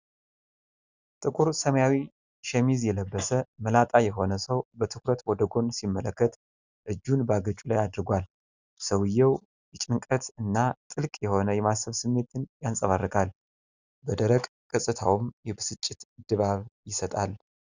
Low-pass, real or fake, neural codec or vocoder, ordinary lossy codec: 7.2 kHz; real; none; Opus, 64 kbps